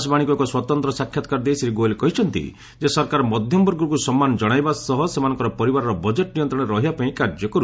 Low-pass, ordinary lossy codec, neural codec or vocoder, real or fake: none; none; none; real